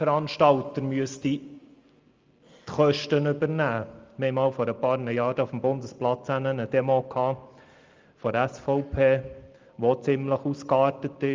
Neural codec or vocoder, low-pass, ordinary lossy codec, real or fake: none; 7.2 kHz; Opus, 32 kbps; real